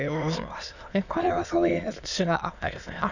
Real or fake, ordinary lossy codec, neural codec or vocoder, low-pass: fake; none; autoencoder, 22.05 kHz, a latent of 192 numbers a frame, VITS, trained on many speakers; 7.2 kHz